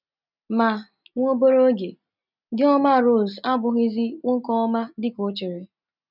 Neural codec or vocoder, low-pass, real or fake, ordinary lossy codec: none; 5.4 kHz; real; none